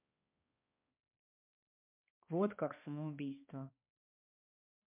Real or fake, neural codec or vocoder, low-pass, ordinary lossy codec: fake; codec, 16 kHz, 2 kbps, X-Codec, HuBERT features, trained on balanced general audio; 3.6 kHz; MP3, 32 kbps